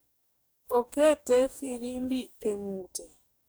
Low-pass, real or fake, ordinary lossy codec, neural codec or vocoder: none; fake; none; codec, 44.1 kHz, 2.6 kbps, DAC